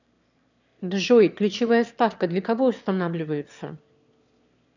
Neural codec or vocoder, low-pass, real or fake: autoencoder, 22.05 kHz, a latent of 192 numbers a frame, VITS, trained on one speaker; 7.2 kHz; fake